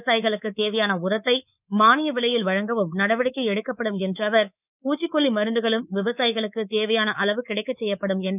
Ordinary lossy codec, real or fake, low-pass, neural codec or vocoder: none; fake; 3.6 kHz; codec, 24 kHz, 3.1 kbps, DualCodec